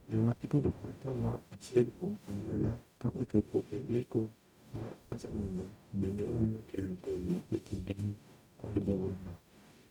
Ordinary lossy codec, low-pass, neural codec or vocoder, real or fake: none; 19.8 kHz; codec, 44.1 kHz, 0.9 kbps, DAC; fake